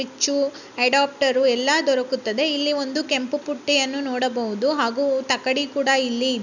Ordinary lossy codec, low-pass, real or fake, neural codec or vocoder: none; 7.2 kHz; real; none